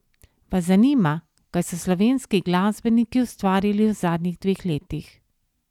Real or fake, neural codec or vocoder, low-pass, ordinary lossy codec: real; none; 19.8 kHz; none